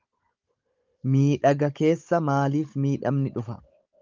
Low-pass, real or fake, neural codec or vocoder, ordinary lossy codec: 7.2 kHz; fake; codec, 16 kHz, 16 kbps, FunCodec, trained on Chinese and English, 50 frames a second; Opus, 24 kbps